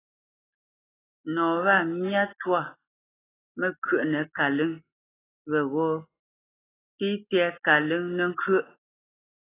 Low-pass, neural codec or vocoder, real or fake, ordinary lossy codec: 3.6 kHz; none; real; AAC, 16 kbps